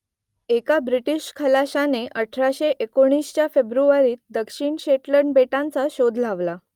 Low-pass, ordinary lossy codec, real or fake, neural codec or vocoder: 19.8 kHz; Opus, 32 kbps; real; none